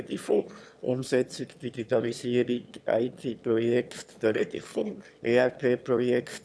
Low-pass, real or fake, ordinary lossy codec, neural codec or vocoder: none; fake; none; autoencoder, 22.05 kHz, a latent of 192 numbers a frame, VITS, trained on one speaker